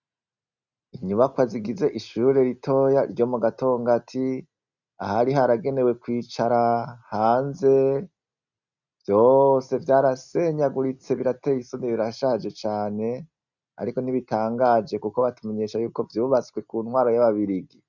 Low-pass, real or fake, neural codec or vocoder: 7.2 kHz; real; none